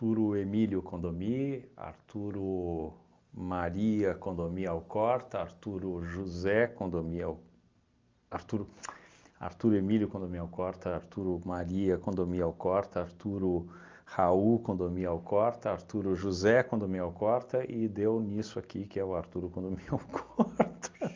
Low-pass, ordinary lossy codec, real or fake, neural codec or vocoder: 7.2 kHz; Opus, 32 kbps; real; none